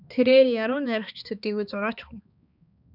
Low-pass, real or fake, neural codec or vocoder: 5.4 kHz; fake; codec, 16 kHz, 2 kbps, X-Codec, HuBERT features, trained on balanced general audio